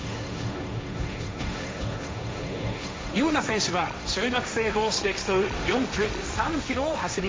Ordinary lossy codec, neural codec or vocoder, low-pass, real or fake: none; codec, 16 kHz, 1.1 kbps, Voila-Tokenizer; none; fake